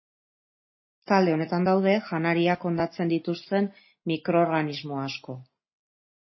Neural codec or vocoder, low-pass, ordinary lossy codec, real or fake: none; 7.2 kHz; MP3, 24 kbps; real